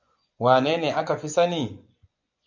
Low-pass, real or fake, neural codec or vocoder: 7.2 kHz; fake; vocoder, 24 kHz, 100 mel bands, Vocos